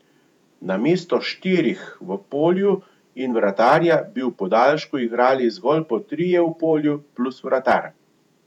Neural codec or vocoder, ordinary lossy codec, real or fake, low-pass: vocoder, 48 kHz, 128 mel bands, Vocos; none; fake; 19.8 kHz